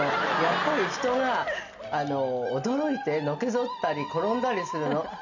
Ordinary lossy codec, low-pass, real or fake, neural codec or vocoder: none; 7.2 kHz; real; none